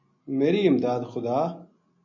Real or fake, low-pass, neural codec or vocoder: real; 7.2 kHz; none